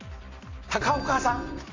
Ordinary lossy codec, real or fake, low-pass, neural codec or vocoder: AAC, 48 kbps; real; 7.2 kHz; none